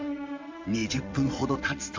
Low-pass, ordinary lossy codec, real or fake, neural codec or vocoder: 7.2 kHz; MP3, 64 kbps; fake; vocoder, 22.05 kHz, 80 mel bands, WaveNeXt